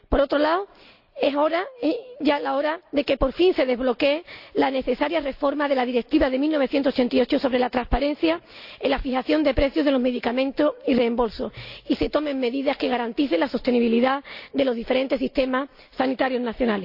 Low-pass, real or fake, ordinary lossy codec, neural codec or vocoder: 5.4 kHz; real; AAC, 48 kbps; none